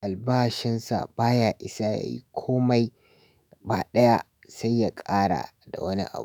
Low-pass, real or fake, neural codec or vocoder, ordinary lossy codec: none; fake; autoencoder, 48 kHz, 128 numbers a frame, DAC-VAE, trained on Japanese speech; none